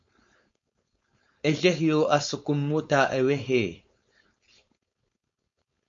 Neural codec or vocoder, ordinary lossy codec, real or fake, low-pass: codec, 16 kHz, 4.8 kbps, FACodec; MP3, 48 kbps; fake; 7.2 kHz